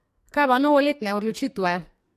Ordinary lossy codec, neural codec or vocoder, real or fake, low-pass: AAC, 64 kbps; codec, 44.1 kHz, 2.6 kbps, SNAC; fake; 14.4 kHz